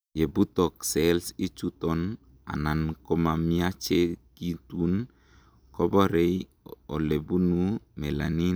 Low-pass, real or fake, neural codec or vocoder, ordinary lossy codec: none; real; none; none